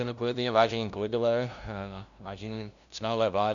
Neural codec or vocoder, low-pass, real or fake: codec, 16 kHz, 0.5 kbps, FunCodec, trained on LibriTTS, 25 frames a second; 7.2 kHz; fake